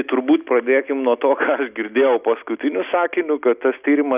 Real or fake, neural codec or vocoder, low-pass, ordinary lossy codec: real; none; 3.6 kHz; Opus, 64 kbps